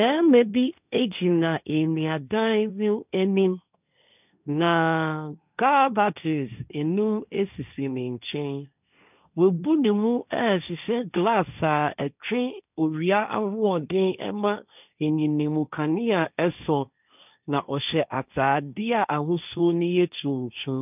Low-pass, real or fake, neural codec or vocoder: 3.6 kHz; fake; codec, 16 kHz, 1.1 kbps, Voila-Tokenizer